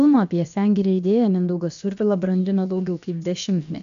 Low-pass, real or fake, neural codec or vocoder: 7.2 kHz; fake; codec, 16 kHz, about 1 kbps, DyCAST, with the encoder's durations